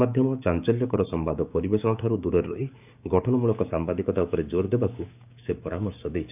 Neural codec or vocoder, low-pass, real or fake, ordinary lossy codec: codec, 16 kHz, 6 kbps, DAC; 3.6 kHz; fake; none